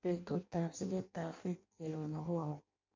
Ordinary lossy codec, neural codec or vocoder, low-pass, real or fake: MP3, 48 kbps; codec, 16 kHz in and 24 kHz out, 0.6 kbps, FireRedTTS-2 codec; 7.2 kHz; fake